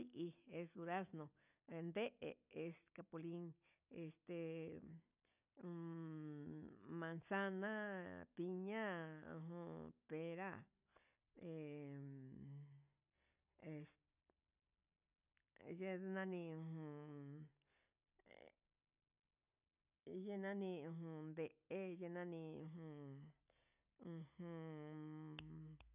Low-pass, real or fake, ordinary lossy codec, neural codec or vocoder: 3.6 kHz; real; none; none